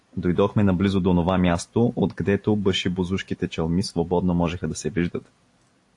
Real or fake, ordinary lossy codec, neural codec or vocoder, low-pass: real; AAC, 48 kbps; none; 10.8 kHz